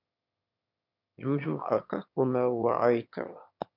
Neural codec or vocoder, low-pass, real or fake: autoencoder, 22.05 kHz, a latent of 192 numbers a frame, VITS, trained on one speaker; 5.4 kHz; fake